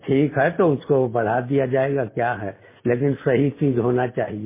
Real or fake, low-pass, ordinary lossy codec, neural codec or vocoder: real; 3.6 kHz; MP3, 16 kbps; none